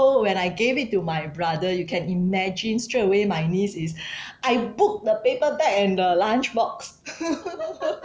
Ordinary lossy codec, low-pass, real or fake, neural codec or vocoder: none; none; real; none